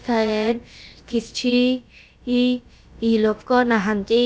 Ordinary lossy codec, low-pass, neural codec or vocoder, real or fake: none; none; codec, 16 kHz, about 1 kbps, DyCAST, with the encoder's durations; fake